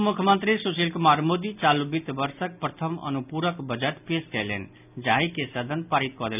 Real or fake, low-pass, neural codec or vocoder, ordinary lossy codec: real; 3.6 kHz; none; none